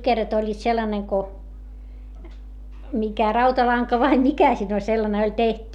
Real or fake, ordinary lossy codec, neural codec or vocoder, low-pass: real; none; none; 19.8 kHz